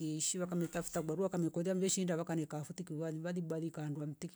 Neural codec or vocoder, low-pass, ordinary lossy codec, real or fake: none; none; none; real